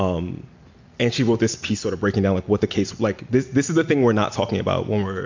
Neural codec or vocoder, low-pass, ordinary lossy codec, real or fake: none; 7.2 kHz; MP3, 64 kbps; real